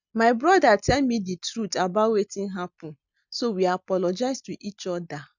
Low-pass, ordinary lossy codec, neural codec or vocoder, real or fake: 7.2 kHz; none; none; real